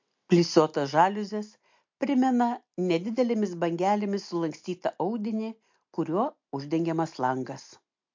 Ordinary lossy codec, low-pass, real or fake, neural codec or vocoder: MP3, 48 kbps; 7.2 kHz; real; none